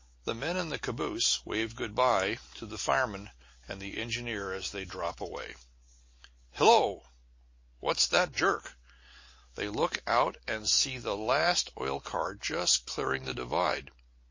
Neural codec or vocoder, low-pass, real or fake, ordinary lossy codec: none; 7.2 kHz; real; MP3, 32 kbps